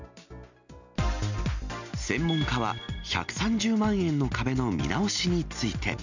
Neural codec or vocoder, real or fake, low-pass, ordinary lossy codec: none; real; 7.2 kHz; none